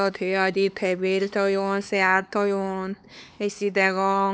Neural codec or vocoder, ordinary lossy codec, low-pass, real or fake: codec, 16 kHz, 4 kbps, X-Codec, HuBERT features, trained on LibriSpeech; none; none; fake